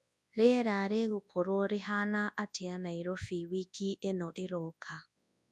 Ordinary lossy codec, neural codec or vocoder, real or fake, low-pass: none; codec, 24 kHz, 0.9 kbps, WavTokenizer, large speech release; fake; none